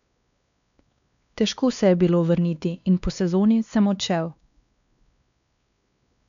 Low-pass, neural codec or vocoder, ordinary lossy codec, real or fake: 7.2 kHz; codec, 16 kHz, 2 kbps, X-Codec, WavLM features, trained on Multilingual LibriSpeech; none; fake